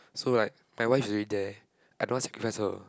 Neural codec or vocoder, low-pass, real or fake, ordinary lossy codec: none; none; real; none